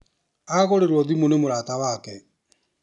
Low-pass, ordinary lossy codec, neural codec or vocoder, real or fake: 10.8 kHz; none; none; real